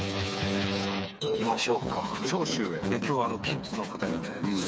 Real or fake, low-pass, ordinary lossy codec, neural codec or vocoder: fake; none; none; codec, 16 kHz, 4 kbps, FreqCodec, smaller model